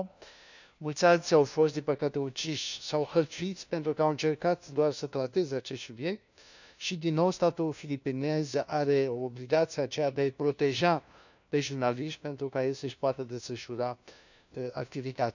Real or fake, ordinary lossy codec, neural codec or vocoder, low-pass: fake; none; codec, 16 kHz, 1 kbps, FunCodec, trained on LibriTTS, 50 frames a second; 7.2 kHz